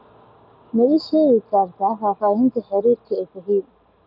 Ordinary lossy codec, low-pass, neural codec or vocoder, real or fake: none; 5.4 kHz; none; real